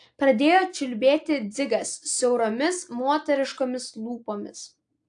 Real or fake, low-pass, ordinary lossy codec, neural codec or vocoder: real; 9.9 kHz; AAC, 64 kbps; none